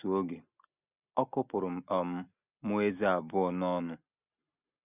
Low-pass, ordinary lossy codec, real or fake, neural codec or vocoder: 3.6 kHz; none; real; none